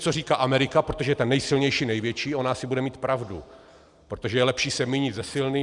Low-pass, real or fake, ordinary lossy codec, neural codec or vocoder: 10.8 kHz; real; Opus, 64 kbps; none